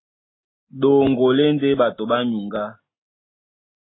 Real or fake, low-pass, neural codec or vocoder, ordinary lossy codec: real; 7.2 kHz; none; AAC, 16 kbps